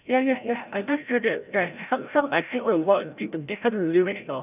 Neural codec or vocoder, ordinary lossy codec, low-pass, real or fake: codec, 16 kHz, 0.5 kbps, FreqCodec, larger model; none; 3.6 kHz; fake